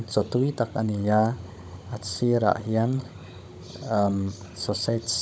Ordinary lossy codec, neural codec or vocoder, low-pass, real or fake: none; codec, 16 kHz, 16 kbps, FunCodec, trained on Chinese and English, 50 frames a second; none; fake